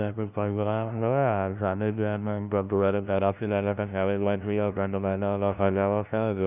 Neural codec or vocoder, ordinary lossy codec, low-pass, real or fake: codec, 16 kHz, 0.5 kbps, FunCodec, trained on LibriTTS, 25 frames a second; none; 3.6 kHz; fake